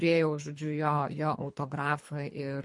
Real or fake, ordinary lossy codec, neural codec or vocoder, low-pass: fake; MP3, 48 kbps; codec, 24 kHz, 3 kbps, HILCodec; 10.8 kHz